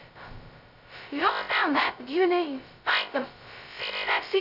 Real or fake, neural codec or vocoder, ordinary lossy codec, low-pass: fake; codec, 16 kHz, 0.2 kbps, FocalCodec; none; 5.4 kHz